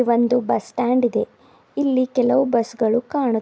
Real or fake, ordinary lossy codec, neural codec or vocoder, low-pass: real; none; none; none